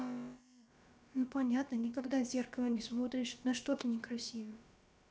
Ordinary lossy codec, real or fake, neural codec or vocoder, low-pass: none; fake; codec, 16 kHz, about 1 kbps, DyCAST, with the encoder's durations; none